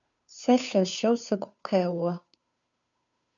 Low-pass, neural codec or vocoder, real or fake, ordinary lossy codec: 7.2 kHz; codec, 16 kHz, 2 kbps, FunCodec, trained on Chinese and English, 25 frames a second; fake; MP3, 96 kbps